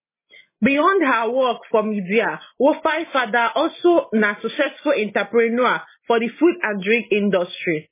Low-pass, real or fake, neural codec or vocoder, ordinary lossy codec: 3.6 kHz; real; none; MP3, 16 kbps